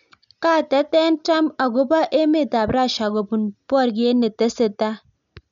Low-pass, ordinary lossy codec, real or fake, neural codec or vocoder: 7.2 kHz; none; real; none